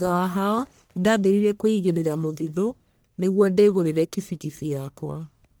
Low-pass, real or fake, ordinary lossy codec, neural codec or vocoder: none; fake; none; codec, 44.1 kHz, 1.7 kbps, Pupu-Codec